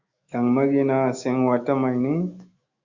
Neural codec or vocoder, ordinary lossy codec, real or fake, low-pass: autoencoder, 48 kHz, 128 numbers a frame, DAC-VAE, trained on Japanese speech; Opus, 64 kbps; fake; 7.2 kHz